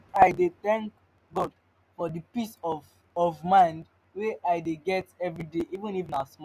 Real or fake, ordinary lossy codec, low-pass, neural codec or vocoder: real; none; 14.4 kHz; none